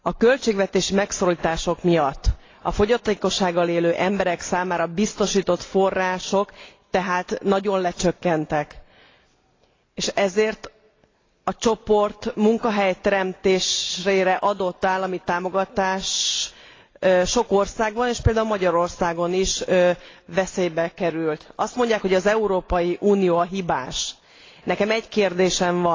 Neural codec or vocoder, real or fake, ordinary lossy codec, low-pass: none; real; AAC, 32 kbps; 7.2 kHz